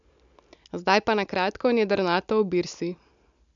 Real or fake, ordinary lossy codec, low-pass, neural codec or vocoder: real; none; 7.2 kHz; none